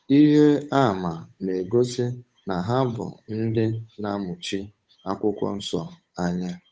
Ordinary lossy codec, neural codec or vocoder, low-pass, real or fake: none; codec, 16 kHz, 8 kbps, FunCodec, trained on Chinese and English, 25 frames a second; none; fake